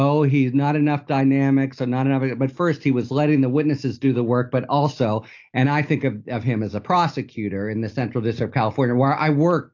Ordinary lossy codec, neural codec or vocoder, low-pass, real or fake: AAC, 48 kbps; none; 7.2 kHz; real